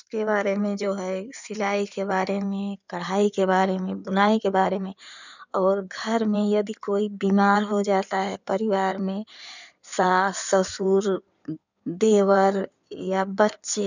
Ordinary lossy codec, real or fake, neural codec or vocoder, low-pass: none; fake; codec, 16 kHz in and 24 kHz out, 2.2 kbps, FireRedTTS-2 codec; 7.2 kHz